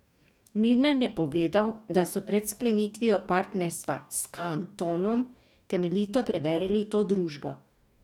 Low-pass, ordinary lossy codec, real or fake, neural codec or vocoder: 19.8 kHz; none; fake; codec, 44.1 kHz, 2.6 kbps, DAC